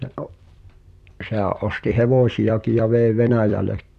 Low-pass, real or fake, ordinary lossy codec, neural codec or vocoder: 14.4 kHz; fake; none; vocoder, 44.1 kHz, 128 mel bands every 256 samples, BigVGAN v2